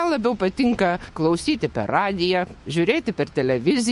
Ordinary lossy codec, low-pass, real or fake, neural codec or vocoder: MP3, 48 kbps; 14.4 kHz; fake; autoencoder, 48 kHz, 128 numbers a frame, DAC-VAE, trained on Japanese speech